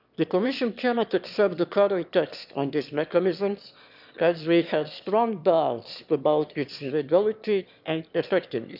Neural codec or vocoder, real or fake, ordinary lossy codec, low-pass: autoencoder, 22.05 kHz, a latent of 192 numbers a frame, VITS, trained on one speaker; fake; none; 5.4 kHz